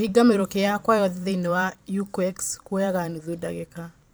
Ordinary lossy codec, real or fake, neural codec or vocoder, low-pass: none; fake; vocoder, 44.1 kHz, 128 mel bands every 512 samples, BigVGAN v2; none